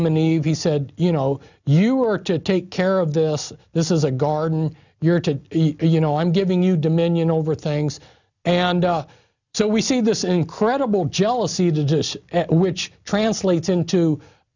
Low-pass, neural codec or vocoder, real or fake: 7.2 kHz; none; real